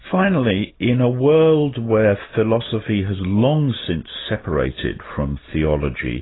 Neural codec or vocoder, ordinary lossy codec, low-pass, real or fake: none; AAC, 16 kbps; 7.2 kHz; real